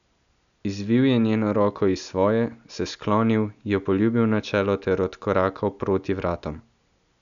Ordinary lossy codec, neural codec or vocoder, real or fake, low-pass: none; none; real; 7.2 kHz